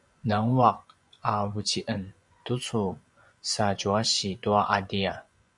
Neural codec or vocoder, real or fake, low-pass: vocoder, 24 kHz, 100 mel bands, Vocos; fake; 10.8 kHz